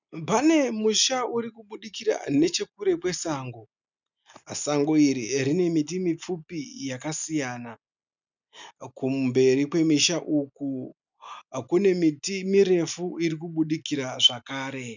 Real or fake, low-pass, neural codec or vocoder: real; 7.2 kHz; none